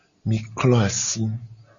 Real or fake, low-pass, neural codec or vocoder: real; 7.2 kHz; none